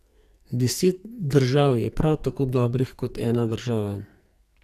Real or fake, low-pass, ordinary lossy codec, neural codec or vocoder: fake; 14.4 kHz; none; codec, 44.1 kHz, 2.6 kbps, SNAC